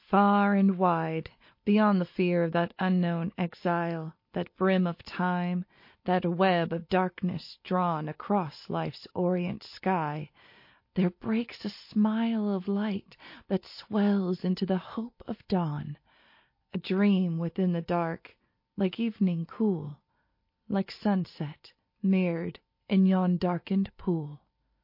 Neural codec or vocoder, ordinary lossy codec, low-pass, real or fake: none; MP3, 32 kbps; 5.4 kHz; real